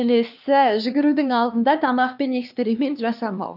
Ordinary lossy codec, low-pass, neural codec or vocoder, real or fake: none; 5.4 kHz; codec, 16 kHz, 2 kbps, X-Codec, HuBERT features, trained on LibriSpeech; fake